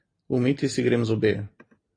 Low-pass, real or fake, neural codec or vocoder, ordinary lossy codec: 9.9 kHz; real; none; AAC, 32 kbps